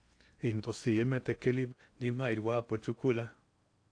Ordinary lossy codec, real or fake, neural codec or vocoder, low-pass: AAC, 48 kbps; fake; codec, 16 kHz in and 24 kHz out, 0.8 kbps, FocalCodec, streaming, 65536 codes; 9.9 kHz